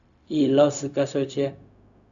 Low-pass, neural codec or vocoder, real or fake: 7.2 kHz; codec, 16 kHz, 0.4 kbps, LongCat-Audio-Codec; fake